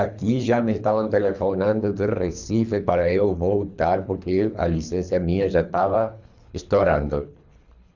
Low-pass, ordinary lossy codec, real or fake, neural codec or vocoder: 7.2 kHz; none; fake; codec, 24 kHz, 3 kbps, HILCodec